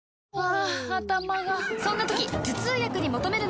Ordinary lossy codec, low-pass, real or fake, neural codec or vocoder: none; none; real; none